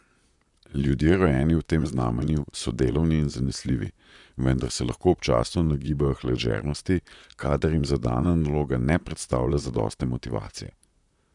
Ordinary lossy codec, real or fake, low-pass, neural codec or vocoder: none; fake; 10.8 kHz; vocoder, 44.1 kHz, 128 mel bands, Pupu-Vocoder